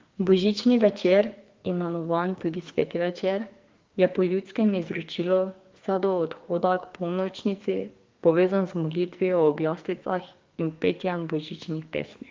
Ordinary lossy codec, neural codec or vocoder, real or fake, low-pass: Opus, 32 kbps; codec, 44.1 kHz, 2.6 kbps, SNAC; fake; 7.2 kHz